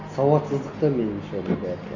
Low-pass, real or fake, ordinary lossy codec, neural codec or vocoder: 7.2 kHz; real; none; none